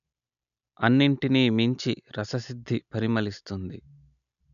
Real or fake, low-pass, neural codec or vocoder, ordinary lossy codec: real; 7.2 kHz; none; none